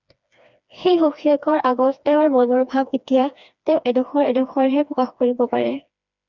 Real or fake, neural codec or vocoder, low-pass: fake; codec, 16 kHz, 2 kbps, FreqCodec, smaller model; 7.2 kHz